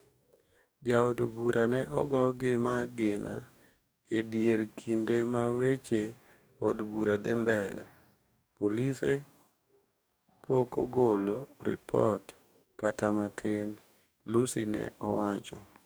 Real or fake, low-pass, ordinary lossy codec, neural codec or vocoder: fake; none; none; codec, 44.1 kHz, 2.6 kbps, DAC